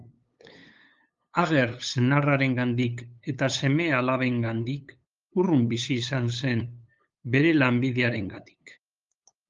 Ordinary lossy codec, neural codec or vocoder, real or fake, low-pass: Opus, 32 kbps; codec, 16 kHz, 8 kbps, FunCodec, trained on LibriTTS, 25 frames a second; fake; 7.2 kHz